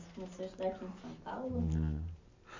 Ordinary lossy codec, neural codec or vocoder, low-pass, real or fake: MP3, 48 kbps; none; 7.2 kHz; real